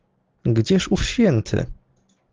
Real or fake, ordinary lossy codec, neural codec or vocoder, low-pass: real; Opus, 16 kbps; none; 7.2 kHz